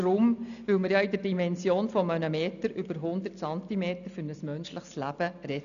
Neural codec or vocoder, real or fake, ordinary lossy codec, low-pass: none; real; none; 7.2 kHz